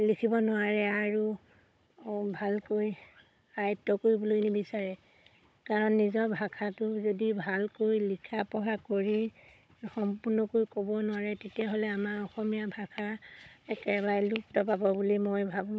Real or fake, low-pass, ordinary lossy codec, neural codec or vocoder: fake; none; none; codec, 16 kHz, 16 kbps, FunCodec, trained on Chinese and English, 50 frames a second